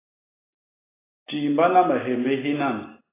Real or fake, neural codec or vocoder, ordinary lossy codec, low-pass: real; none; AAC, 16 kbps; 3.6 kHz